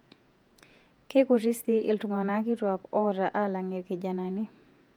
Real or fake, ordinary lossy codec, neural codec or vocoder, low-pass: fake; MP3, 96 kbps; vocoder, 48 kHz, 128 mel bands, Vocos; 19.8 kHz